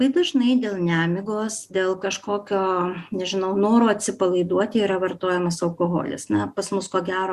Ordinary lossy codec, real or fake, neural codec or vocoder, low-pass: Opus, 64 kbps; real; none; 14.4 kHz